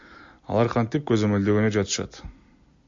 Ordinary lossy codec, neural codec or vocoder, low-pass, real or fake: MP3, 48 kbps; none; 7.2 kHz; real